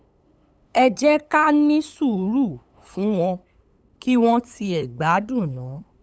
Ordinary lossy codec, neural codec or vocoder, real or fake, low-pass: none; codec, 16 kHz, 8 kbps, FunCodec, trained on LibriTTS, 25 frames a second; fake; none